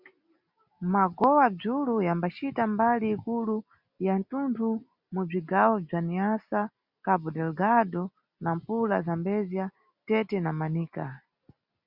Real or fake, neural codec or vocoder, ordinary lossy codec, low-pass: real; none; Opus, 64 kbps; 5.4 kHz